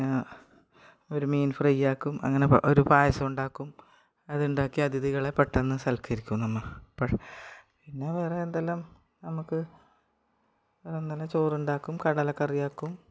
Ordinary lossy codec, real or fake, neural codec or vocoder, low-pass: none; real; none; none